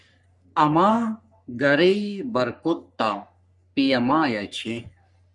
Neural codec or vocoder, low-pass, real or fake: codec, 44.1 kHz, 3.4 kbps, Pupu-Codec; 10.8 kHz; fake